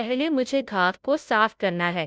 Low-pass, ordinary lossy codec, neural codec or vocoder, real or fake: none; none; codec, 16 kHz, 0.5 kbps, FunCodec, trained on Chinese and English, 25 frames a second; fake